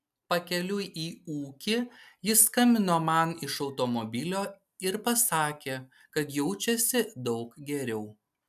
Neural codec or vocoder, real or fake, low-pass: none; real; 14.4 kHz